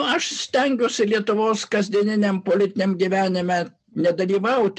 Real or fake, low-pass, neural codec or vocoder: real; 10.8 kHz; none